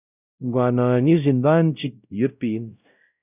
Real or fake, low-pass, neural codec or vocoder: fake; 3.6 kHz; codec, 16 kHz, 0.5 kbps, X-Codec, WavLM features, trained on Multilingual LibriSpeech